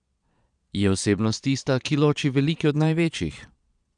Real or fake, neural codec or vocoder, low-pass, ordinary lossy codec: fake; vocoder, 22.05 kHz, 80 mel bands, Vocos; 9.9 kHz; none